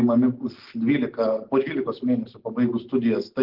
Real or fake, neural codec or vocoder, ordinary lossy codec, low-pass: real; none; Opus, 16 kbps; 5.4 kHz